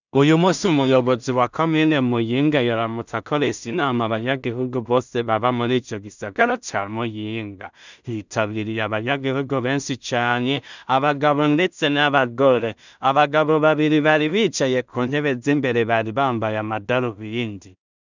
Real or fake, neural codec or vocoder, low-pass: fake; codec, 16 kHz in and 24 kHz out, 0.4 kbps, LongCat-Audio-Codec, two codebook decoder; 7.2 kHz